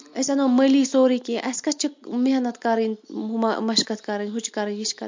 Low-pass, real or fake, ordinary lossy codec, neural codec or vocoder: 7.2 kHz; real; MP3, 48 kbps; none